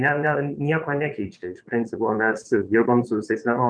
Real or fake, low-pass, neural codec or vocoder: fake; 9.9 kHz; vocoder, 22.05 kHz, 80 mel bands, Vocos